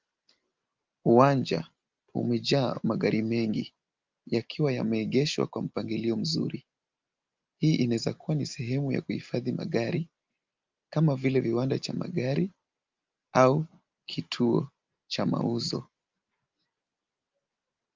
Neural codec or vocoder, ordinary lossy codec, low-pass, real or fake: none; Opus, 24 kbps; 7.2 kHz; real